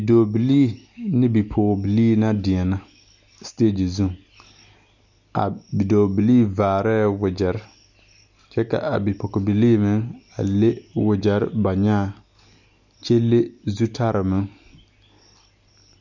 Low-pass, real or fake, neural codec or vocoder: 7.2 kHz; real; none